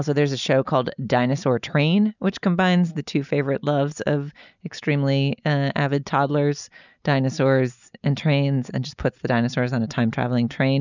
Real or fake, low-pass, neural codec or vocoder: real; 7.2 kHz; none